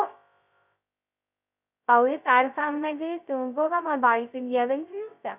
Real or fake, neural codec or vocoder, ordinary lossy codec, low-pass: fake; codec, 16 kHz, 0.2 kbps, FocalCodec; none; 3.6 kHz